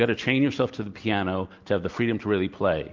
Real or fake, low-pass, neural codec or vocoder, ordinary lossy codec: real; 7.2 kHz; none; Opus, 32 kbps